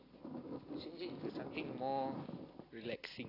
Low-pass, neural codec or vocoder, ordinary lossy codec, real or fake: 5.4 kHz; none; AAC, 24 kbps; real